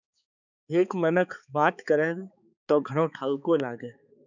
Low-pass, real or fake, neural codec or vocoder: 7.2 kHz; fake; codec, 16 kHz, 4 kbps, X-Codec, HuBERT features, trained on balanced general audio